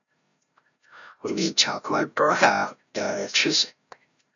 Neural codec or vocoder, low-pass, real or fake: codec, 16 kHz, 0.5 kbps, FreqCodec, larger model; 7.2 kHz; fake